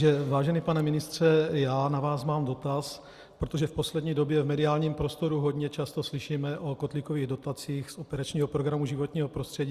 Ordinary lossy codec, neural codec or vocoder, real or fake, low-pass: Opus, 64 kbps; none; real; 14.4 kHz